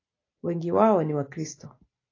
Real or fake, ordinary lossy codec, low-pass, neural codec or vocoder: real; AAC, 32 kbps; 7.2 kHz; none